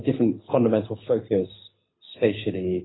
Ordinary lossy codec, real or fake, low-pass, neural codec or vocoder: AAC, 16 kbps; fake; 7.2 kHz; codec, 24 kHz, 6 kbps, HILCodec